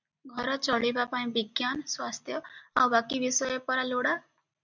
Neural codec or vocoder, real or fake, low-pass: none; real; 7.2 kHz